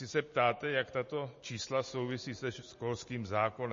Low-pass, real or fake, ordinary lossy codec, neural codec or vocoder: 7.2 kHz; real; MP3, 32 kbps; none